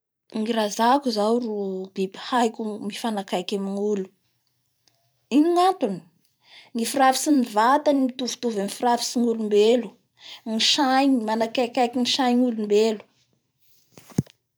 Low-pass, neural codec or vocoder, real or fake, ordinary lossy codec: none; vocoder, 44.1 kHz, 128 mel bands every 512 samples, BigVGAN v2; fake; none